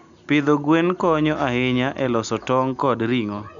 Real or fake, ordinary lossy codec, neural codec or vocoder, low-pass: real; none; none; 7.2 kHz